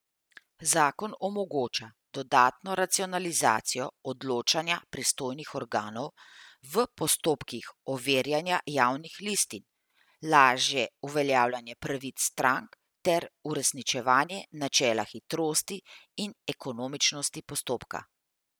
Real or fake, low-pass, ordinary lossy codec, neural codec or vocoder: real; none; none; none